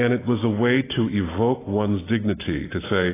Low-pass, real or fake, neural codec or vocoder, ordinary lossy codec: 3.6 kHz; real; none; AAC, 16 kbps